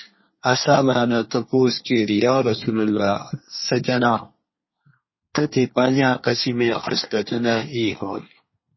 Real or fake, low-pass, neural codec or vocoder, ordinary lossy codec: fake; 7.2 kHz; codec, 24 kHz, 1 kbps, SNAC; MP3, 24 kbps